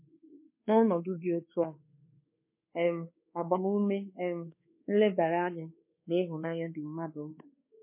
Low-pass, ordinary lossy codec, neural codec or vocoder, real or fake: 3.6 kHz; MP3, 24 kbps; codec, 24 kHz, 1.2 kbps, DualCodec; fake